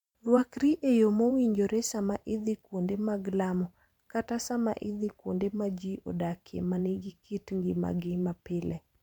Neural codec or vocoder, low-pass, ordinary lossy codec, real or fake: vocoder, 44.1 kHz, 128 mel bands every 512 samples, BigVGAN v2; 19.8 kHz; MP3, 96 kbps; fake